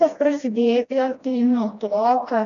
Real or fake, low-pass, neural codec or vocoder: fake; 7.2 kHz; codec, 16 kHz, 1 kbps, FreqCodec, smaller model